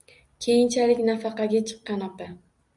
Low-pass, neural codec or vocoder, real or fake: 10.8 kHz; none; real